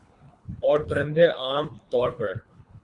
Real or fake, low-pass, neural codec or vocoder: fake; 10.8 kHz; codec, 24 kHz, 3 kbps, HILCodec